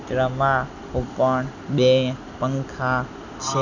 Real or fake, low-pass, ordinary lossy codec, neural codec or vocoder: real; 7.2 kHz; none; none